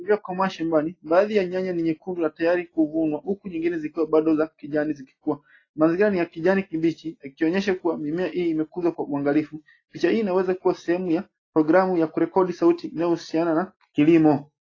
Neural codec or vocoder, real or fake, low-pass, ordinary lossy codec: none; real; 7.2 kHz; AAC, 32 kbps